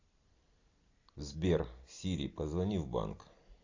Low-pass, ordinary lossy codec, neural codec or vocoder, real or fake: 7.2 kHz; AAC, 48 kbps; none; real